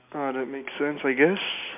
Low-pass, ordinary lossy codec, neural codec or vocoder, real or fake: 3.6 kHz; MP3, 32 kbps; none; real